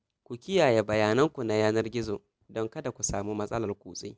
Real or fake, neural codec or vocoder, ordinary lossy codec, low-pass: real; none; none; none